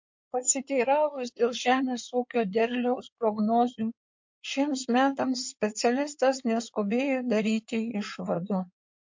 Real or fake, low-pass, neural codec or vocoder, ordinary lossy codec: fake; 7.2 kHz; codec, 16 kHz in and 24 kHz out, 2.2 kbps, FireRedTTS-2 codec; MP3, 48 kbps